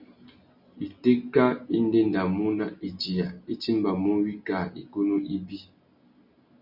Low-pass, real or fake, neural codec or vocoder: 5.4 kHz; real; none